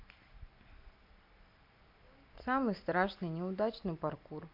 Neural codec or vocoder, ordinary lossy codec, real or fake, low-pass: none; MP3, 48 kbps; real; 5.4 kHz